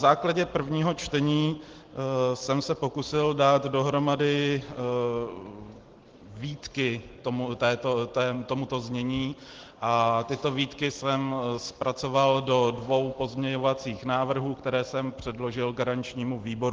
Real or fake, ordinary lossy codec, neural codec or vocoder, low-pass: real; Opus, 16 kbps; none; 7.2 kHz